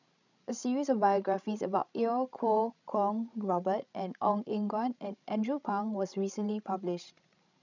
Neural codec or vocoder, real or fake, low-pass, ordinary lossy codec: codec, 16 kHz, 16 kbps, FreqCodec, larger model; fake; 7.2 kHz; none